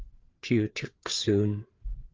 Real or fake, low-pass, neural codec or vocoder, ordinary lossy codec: fake; 7.2 kHz; codec, 16 kHz, 4 kbps, FreqCodec, larger model; Opus, 16 kbps